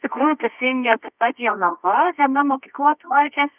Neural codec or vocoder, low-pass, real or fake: codec, 24 kHz, 0.9 kbps, WavTokenizer, medium music audio release; 3.6 kHz; fake